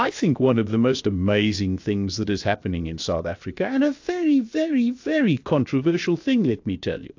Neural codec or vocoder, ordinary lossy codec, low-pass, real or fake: codec, 16 kHz, 0.7 kbps, FocalCodec; AAC, 48 kbps; 7.2 kHz; fake